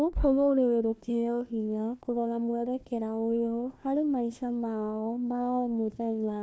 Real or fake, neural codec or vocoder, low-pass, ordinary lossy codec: fake; codec, 16 kHz, 1 kbps, FunCodec, trained on Chinese and English, 50 frames a second; none; none